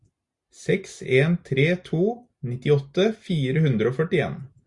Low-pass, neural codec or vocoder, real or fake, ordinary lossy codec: 10.8 kHz; none; real; Opus, 64 kbps